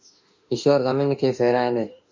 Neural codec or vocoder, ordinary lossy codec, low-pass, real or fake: autoencoder, 48 kHz, 32 numbers a frame, DAC-VAE, trained on Japanese speech; MP3, 48 kbps; 7.2 kHz; fake